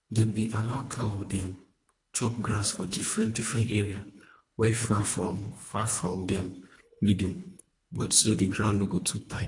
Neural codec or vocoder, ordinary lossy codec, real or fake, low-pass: codec, 24 kHz, 1.5 kbps, HILCodec; AAC, 48 kbps; fake; 10.8 kHz